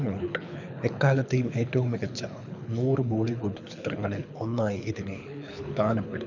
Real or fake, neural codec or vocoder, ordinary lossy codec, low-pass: fake; codec, 24 kHz, 6 kbps, HILCodec; none; 7.2 kHz